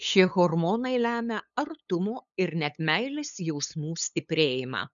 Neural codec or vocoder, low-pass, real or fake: codec, 16 kHz, 8 kbps, FunCodec, trained on LibriTTS, 25 frames a second; 7.2 kHz; fake